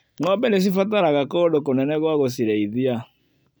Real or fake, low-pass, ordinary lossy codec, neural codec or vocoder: real; none; none; none